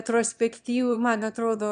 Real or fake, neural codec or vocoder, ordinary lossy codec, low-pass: fake; autoencoder, 22.05 kHz, a latent of 192 numbers a frame, VITS, trained on one speaker; MP3, 96 kbps; 9.9 kHz